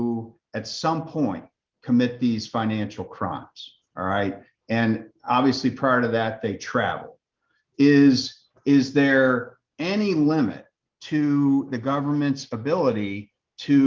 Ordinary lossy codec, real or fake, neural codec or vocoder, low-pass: Opus, 32 kbps; real; none; 7.2 kHz